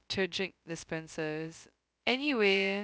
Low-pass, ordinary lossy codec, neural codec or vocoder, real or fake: none; none; codec, 16 kHz, 0.2 kbps, FocalCodec; fake